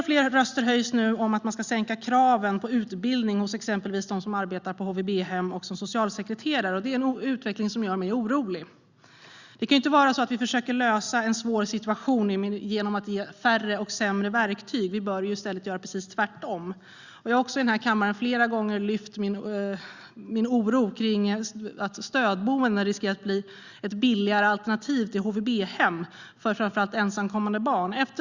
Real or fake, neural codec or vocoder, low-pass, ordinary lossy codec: real; none; 7.2 kHz; Opus, 64 kbps